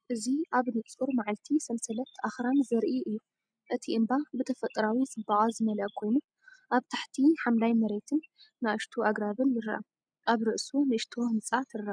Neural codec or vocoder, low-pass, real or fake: none; 9.9 kHz; real